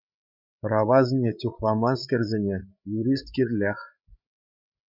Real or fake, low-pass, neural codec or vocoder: fake; 5.4 kHz; codec, 16 kHz, 8 kbps, FreqCodec, larger model